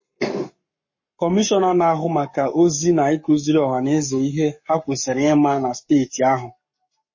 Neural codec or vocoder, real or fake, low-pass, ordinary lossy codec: codec, 44.1 kHz, 7.8 kbps, Pupu-Codec; fake; 7.2 kHz; MP3, 32 kbps